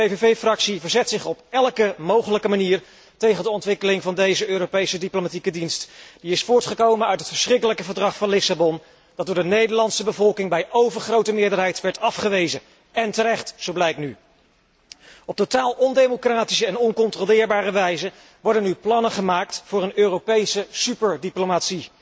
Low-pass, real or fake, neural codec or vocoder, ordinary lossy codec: none; real; none; none